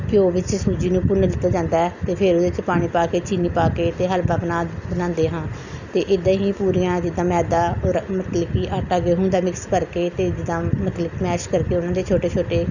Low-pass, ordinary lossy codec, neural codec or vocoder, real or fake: 7.2 kHz; none; none; real